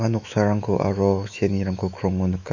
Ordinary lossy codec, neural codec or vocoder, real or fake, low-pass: none; autoencoder, 48 kHz, 128 numbers a frame, DAC-VAE, trained on Japanese speech; fake; 7.2 kHz